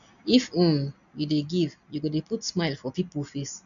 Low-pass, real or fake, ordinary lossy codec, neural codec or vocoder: 7.2 kHz; real; none; none